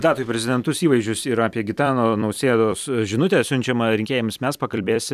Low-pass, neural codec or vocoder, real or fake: 14.4 kHz; vocoder, 44.1 kHz, 128 mel bands every 256 samples, BigVGAN v2; fake